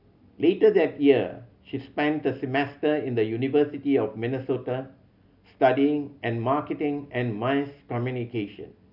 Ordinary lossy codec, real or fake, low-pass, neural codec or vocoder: none; real; 5.4 kHz; none